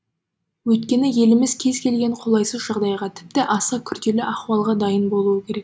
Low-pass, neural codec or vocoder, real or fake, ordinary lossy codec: none; none; real; none